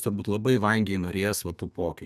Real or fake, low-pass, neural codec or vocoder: fake; 14.4 kHz; codec, 32 kHz, 1.9 kbps, SNAC